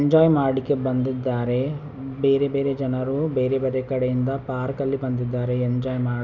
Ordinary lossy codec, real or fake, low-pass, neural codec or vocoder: none; real; 7.2 kHz; none